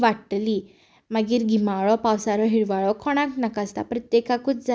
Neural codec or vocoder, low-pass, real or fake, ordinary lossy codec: none; none; real; none